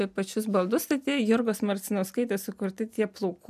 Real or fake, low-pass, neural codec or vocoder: real; 14.4 kHz; none